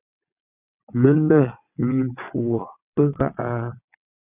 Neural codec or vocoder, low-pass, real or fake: vocoder, 22.05 kHz, 80 mel bands, WaveNeXt; 3.6 kHz; fake